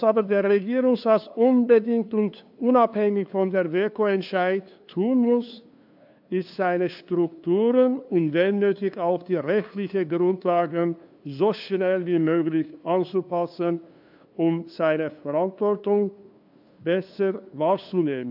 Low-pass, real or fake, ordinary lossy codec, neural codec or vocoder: 5.4 kHz; fake; AAC, 48 kbps; codec, 16 kHz, 2 kbps, FunCodec, trained on LibriTTS, 25 frames a second